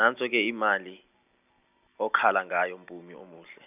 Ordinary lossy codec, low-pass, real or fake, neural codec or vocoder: none; 3.6 kHz; real; none